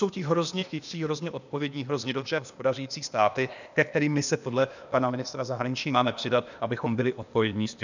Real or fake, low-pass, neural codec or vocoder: fake; 7.2 kHz; codec, 16 kHz, 0.8 kbps, ZipCodec